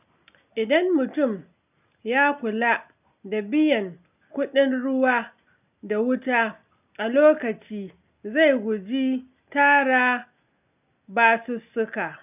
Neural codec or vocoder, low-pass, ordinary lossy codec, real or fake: none; 3.6 kHz; none; real